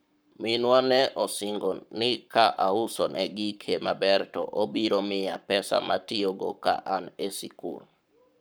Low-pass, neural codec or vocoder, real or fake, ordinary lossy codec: none; codec, 44.1 kHz, 7.8 kbps, Pupu-Codec; fake; none